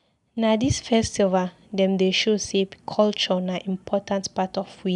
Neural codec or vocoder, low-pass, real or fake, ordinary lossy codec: none; 10.8 kHz; real; none